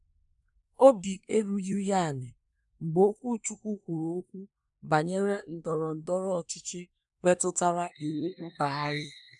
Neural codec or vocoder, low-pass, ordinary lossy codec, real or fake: codec, 24 kHz, 1 kbps, SNAC; 10.8 kHz; none; fake